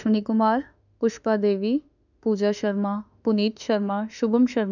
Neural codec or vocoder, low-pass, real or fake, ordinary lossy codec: autoencoder, 48 kHz, 32 numbers a frame, DAC-VAE, trained on Japanese speech; 7.2 kHz; fake; none